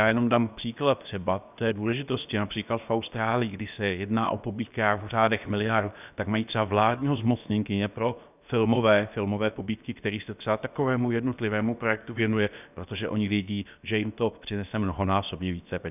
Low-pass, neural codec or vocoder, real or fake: 3.6 kHz; codec, 16 kHz, about 1 kbps, DyCAST, with the encoder's durations; fake